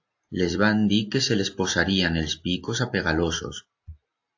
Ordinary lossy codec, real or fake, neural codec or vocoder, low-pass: AAC, 48 kbps; real; none; 7.2 kHz